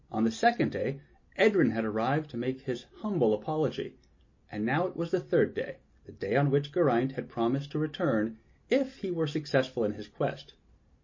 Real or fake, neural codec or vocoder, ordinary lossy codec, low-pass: real; none; MP3, 32 kbps; 7.2 kHz